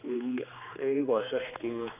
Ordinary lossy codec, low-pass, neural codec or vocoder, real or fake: none; 3.6 kHz; codec, 16 kHz, 2 kbps, X-Codec, HuBERT features, trained on general audio; fake